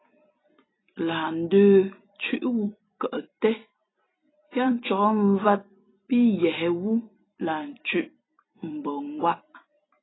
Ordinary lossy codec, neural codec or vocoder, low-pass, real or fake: AAC, 16 kbps; vocoder, 44.1 kHz, 128 mel bands every 256 samples, BigVGAN v2; 7.2 kHz; fake